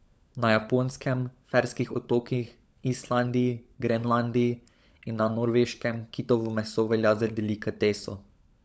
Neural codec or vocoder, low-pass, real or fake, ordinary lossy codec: codec, 16 kHz, 16 kbps, FunCodec, trained on LibriTTS, 50 frames a second; none; fake; none